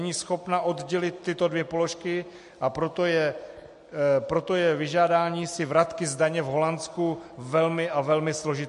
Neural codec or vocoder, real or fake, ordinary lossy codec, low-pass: none; real; MP3, 48 kbps; 14.4 kHz